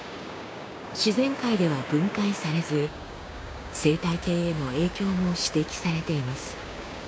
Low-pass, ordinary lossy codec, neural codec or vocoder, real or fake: none; none; codec, 16 kHz, 6 kbps, DAC; fake